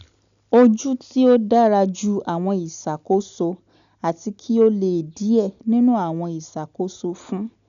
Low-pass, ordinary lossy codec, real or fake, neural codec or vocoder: 7.2 kHz; none; real; none